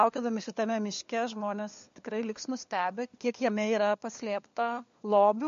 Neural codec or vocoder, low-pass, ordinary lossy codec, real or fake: codec, 16 kHz, 4 kbps, FunCodec, trained on LibriTTS, 50 frames a second; 7.2 kHz; MP3, 48 kbps; fake